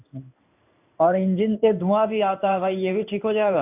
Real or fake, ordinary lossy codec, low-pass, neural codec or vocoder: fake; none; 3.6 kHz; codec, 16 kHz in and 24 kHz out, 1 kbps, XY-Tokenizer